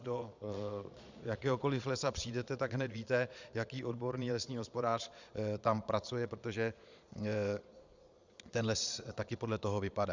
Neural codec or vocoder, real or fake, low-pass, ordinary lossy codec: vocoder, 22.05 kHz, 80 mel bands, Vocos; fake; 7.2 kHz; Opus, 64 kbps